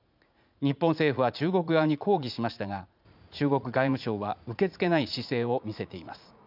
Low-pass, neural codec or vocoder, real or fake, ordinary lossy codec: 5.4 kHz; vocoder, 44.1 kHz, 80 mel bands, Vocos; fake; none